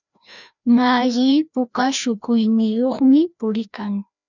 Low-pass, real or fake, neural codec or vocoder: 7.2 kHz; fake; codec, 16 kHz, 1 kbps, FreqCodec, larger model